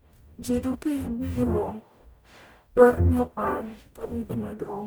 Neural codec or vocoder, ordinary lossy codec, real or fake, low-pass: codec, 44.1 kHz, 0.9 kbps, DAC; none; fake; none